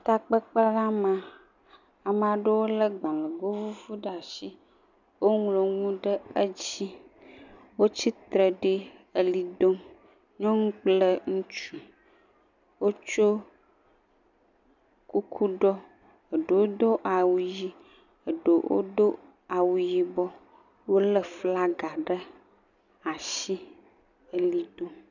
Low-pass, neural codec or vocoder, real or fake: 7.2 kHz; none; real